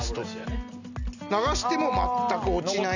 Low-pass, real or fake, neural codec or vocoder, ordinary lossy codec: 7.2 kHz; real; none; none